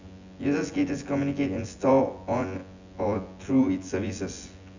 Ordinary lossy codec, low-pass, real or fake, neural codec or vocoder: Opus, 64 kbps; 7.2 kHz; fake; vocoder, 24 kHz, 100 mel bands, Vocos